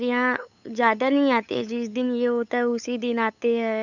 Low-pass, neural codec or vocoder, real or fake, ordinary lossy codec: 7.2 kHz; codec, 16 kHz, 8 kbps, FunCodec, trained on LibriTTS, 25 frames a second; fake; none